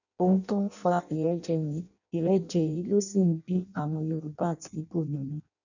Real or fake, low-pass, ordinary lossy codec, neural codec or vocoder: fake; 7.2 kHz; none; codec, 16 kHz in and 24 kHz out, 0.6 kbps, FireRedTTS-2 codec